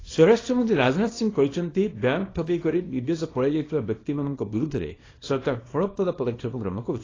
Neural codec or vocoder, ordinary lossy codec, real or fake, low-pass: codec, 24 kHz, 0.9 kbps, WavTokenizer, small release; AAC, 32 kbps; fake; 7.2 kHz